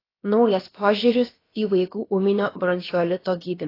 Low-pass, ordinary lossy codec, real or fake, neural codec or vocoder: 5.4 kHz; AAC, 24 kbps; fake; codec, 16 kHz, about 1 kbps, DyCAST, with the encoder's durations